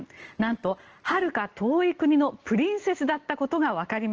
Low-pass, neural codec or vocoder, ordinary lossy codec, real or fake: 7.2 kHz; none; Opus, 16 kbps; real